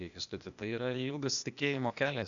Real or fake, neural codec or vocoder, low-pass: fake; codec, 16 kHz, 0.8 kbps, ZipCodec; 7.2 kHz